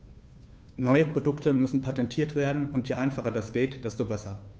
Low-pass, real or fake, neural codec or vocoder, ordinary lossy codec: none; fake; codec, 16 kHz, 2 kbps, FunCodec, trained on Chinese and English, 25 frames a second; none